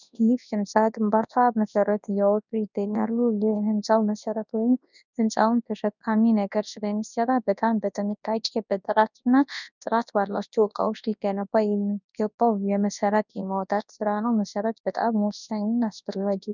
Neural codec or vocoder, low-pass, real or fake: codec, 24 kHz, 0.9 kbps, WavTokenizer, large speech release; 7.2 kHz; fake